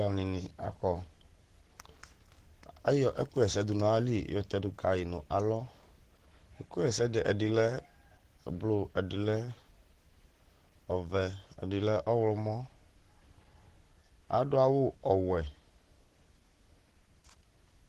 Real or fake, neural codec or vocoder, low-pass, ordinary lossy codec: fake; codec, 44.1 kHz, 7.8 kbps, Pupu-Codec; 14.4 kHz; Opus, 16 kbps